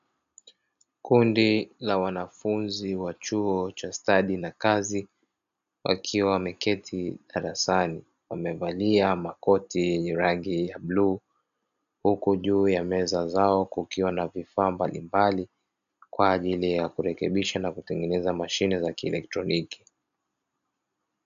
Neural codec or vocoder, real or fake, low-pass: none; real; 7.2 kHz